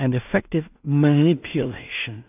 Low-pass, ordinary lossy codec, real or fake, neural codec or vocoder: 3.6 kHz; AAC, 32 kbps; fake; codec, 16 kHz in and 24 kHz out, 0.4 kbps, LongCat-Audio-Codec, two codebook decoder